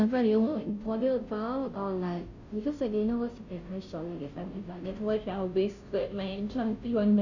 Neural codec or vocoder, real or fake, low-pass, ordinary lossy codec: codec, 16 kHz, 0.5 kbps, FunCodec, trained on Chinese and English, 25 frames a second; fake; 7.2 kHz; none